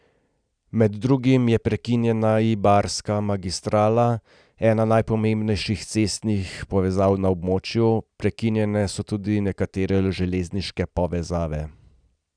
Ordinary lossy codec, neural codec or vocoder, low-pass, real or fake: none; none; 9.9 kHz; real